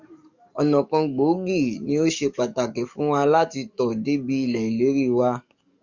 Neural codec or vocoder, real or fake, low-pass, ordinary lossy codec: codec, 44.1 kHz, 7.8 kbps, DAC; fake; 7.2 kHz; Opus, 64 kbps